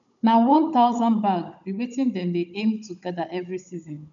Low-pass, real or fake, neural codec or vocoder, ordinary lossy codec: 7.2 kHz; fake; codec, 16 kHz, 16 kbps, FunCodec, trained on Chinese and English, 50 frames a second; none